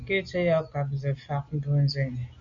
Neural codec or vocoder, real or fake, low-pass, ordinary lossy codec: none; real; 7.2 kHz; Opus, 64 kbps